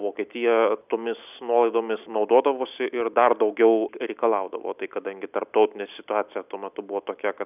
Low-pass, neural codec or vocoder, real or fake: 3.6 kHz; none; real